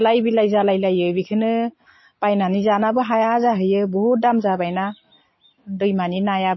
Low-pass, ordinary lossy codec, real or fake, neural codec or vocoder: 7.2 kHz; MP3, 24 kbps; real; none